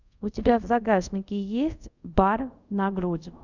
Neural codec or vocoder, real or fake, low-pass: codec, 24 kHz, 0.5 kbps, DualCodec; fake; 7.2 kHz